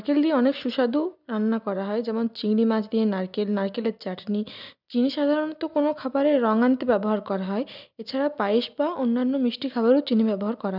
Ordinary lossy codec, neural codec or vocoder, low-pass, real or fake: none; none; 5.4 kHz; real